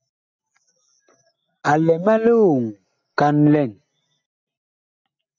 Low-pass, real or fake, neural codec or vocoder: 7.2 kHz; real; none